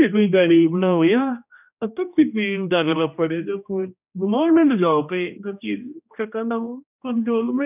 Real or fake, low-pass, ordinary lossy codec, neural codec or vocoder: fake; 3.6 kHz; none; codec, 16 kHz, 1 kbps, X-Codec, HuBERT features, trained on general audio